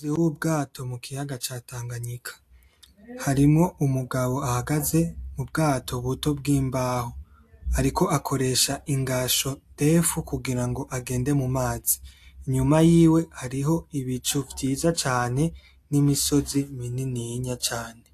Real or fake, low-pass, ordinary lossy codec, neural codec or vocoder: real; 14.4 kHz; AAC, 64 kbps; none